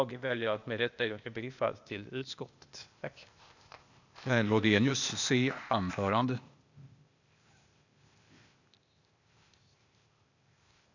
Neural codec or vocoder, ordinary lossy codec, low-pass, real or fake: codec, 16 kHz, 0.8 kbps, ZipCodec; none; 7.2 kHz; fake